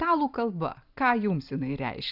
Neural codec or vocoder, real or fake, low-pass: none; real; 5.4 kHz